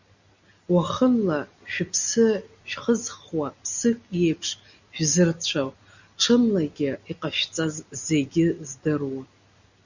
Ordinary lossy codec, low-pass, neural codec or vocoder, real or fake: Opus, 64 kbps; 7.2 kHz; none; real